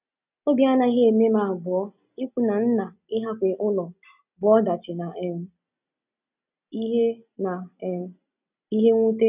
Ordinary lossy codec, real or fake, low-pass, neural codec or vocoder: none; real; 3.6 kHz; none